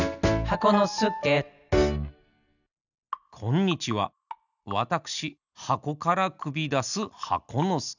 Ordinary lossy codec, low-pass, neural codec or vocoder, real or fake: none; 7.2 kHz; none; real